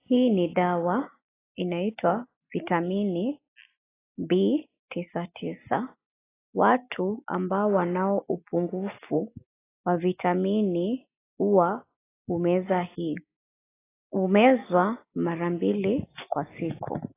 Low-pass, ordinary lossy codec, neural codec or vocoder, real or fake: 3.6 kHz; AAC, 16 kbps; none; real